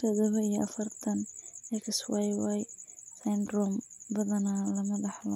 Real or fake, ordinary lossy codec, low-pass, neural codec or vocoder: real; none; 19.8 kHz; none